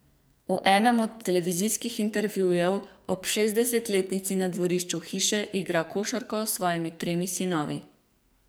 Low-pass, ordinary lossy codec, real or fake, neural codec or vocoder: none; none; fake; codec, 44.1 kHz, 2.6 kbps, SNAC